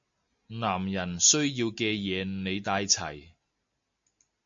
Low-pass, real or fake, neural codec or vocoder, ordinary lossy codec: 7.2 kHz; real; none; MP3, 48 kbps